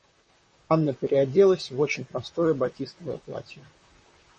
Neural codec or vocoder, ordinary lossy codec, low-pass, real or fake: vocoder, 44.1 kHz, 128 mel bands, Pupu-Vocoder; MP3, 32 kbps; 10.8 kHz; fake